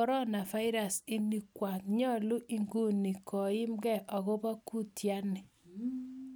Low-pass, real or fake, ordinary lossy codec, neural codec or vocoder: none; real; none; none